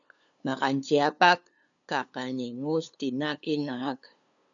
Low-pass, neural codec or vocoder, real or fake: 7.2 kHz; codec, 16 kHz, 2 kbps, FunCodec, trained on LibriTTS, 25 frames a second; fake